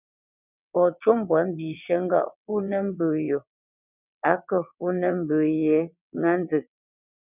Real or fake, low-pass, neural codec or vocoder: fake; 3.6 kHz; vocoder, 22.05 kHz, 80 mel bands, WaveNeXt